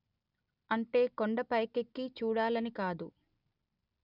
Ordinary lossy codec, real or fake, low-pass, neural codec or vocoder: none; real; 5.4 kHz; none